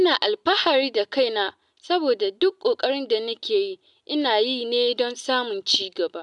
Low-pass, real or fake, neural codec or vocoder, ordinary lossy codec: 10.8 kHz; real; none; none